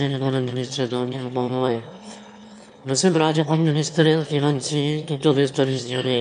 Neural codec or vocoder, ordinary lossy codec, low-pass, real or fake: autoencoder, 22.05 kHz, a latent of 192 numbers a frame, VITS, trained on one speaker; AAC, 96 kbps; 9.9 kHz; fake